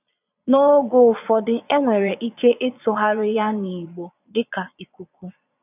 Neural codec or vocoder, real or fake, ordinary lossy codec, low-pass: vocoder, 22.05 kHz, 80 mel bands, Vocos; fake; none; 3.6 kHz